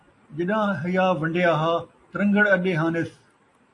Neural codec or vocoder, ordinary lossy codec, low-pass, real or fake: none; AAC, 48 kbps; 10.8 kHz; real